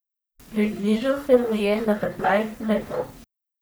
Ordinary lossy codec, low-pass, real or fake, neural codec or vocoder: none; none; fake; codec, 44.1 kHz, 1.7 kbps, Pupu-Codec